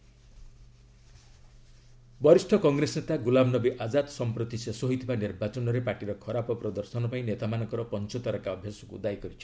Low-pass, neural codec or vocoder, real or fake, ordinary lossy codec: none; none; real; none